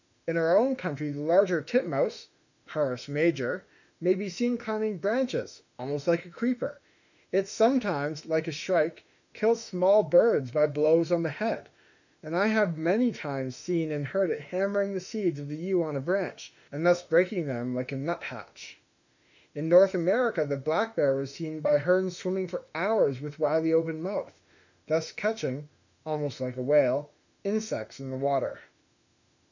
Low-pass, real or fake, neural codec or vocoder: 7.2 kHz; fake; autoencoder, 48 kHz, 32 numbers a frame, DAC-VAE, trained on Japanese speech